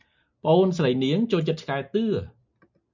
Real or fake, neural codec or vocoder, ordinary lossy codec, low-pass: real; none; MP3, 64 kbps; 7.2 kHz